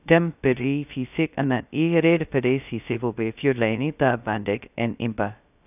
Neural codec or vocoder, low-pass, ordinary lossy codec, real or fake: codec, 16 kHz, 0.2 kbps, FocalCodec; 3.6 kHz; none; fake